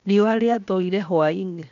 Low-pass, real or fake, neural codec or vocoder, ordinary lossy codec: 7.2 kHz; fake; codec, 16 kHz, 0.7 kbps, FocalCodec; none